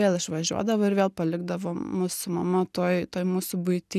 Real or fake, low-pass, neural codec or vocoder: real; 14.4 kHz; none